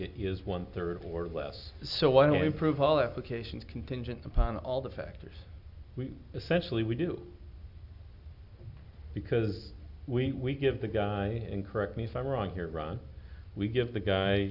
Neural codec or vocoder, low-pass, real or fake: none; 5.4 kHz; real